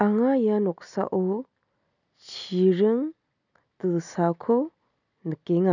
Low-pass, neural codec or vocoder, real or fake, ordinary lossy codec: 7.2 kHz; none; real; none